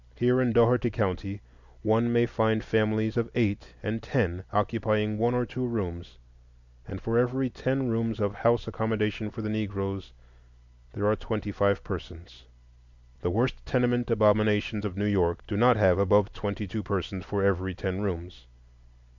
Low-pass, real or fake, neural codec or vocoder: 7.2 kHz; real; none